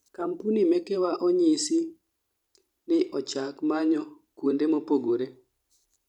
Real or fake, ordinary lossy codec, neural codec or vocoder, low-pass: fake; none; vocoder, 44.1 kHz, 128 mel bands every 512 samples, BigVGAN v2; 19.8 kHz